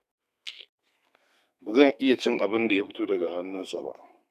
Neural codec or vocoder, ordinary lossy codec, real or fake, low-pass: codec, 32 kHz, 1.9 kbps, SNAC; none; fake; 14.4 kHz